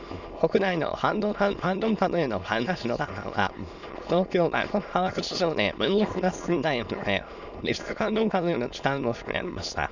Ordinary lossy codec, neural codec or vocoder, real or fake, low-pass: none; autoencoder, 22.05 kHz, a latent of 192 numbers a frame, VITS, trained on many speakers; fake; 7.2 kHz